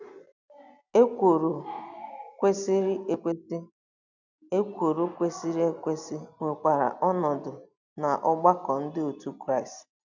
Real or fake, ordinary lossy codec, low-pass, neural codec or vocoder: real; none; 7.2 kHz; none